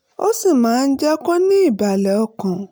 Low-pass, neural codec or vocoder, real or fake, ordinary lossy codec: none; none; real; none